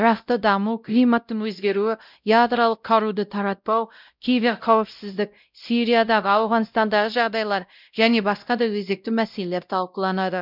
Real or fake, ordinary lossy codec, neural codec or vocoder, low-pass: fake; none; codec, 16 kHz, 0.5 kbps, X-Codec, WavLM features, trained on Multilingual LibriSpeech; 5.4 kHz